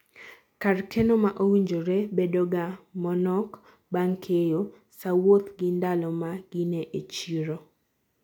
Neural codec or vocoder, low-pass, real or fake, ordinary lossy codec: none; 19.8 kHz; real; none